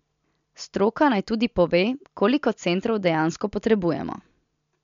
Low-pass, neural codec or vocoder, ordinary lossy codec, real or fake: 7.2 kHz; none; MP3, 64 kbps; real